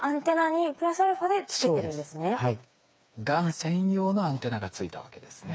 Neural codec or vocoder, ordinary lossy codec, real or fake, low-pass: codec, 16 kHz, 4 kbps, FreqCodec, smaller model; none; fake; none